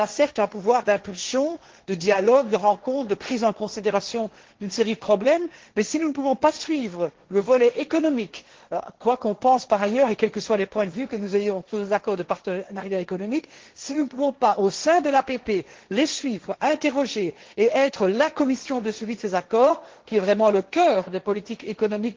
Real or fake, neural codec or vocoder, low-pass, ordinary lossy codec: fake; codec, 16 kHz, 1.1 kbps, Voila-Tokenizer; 7.2 kHz; Opus, 16 kbps